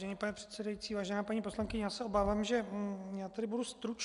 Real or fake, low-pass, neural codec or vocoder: real; 10.8 kHz; none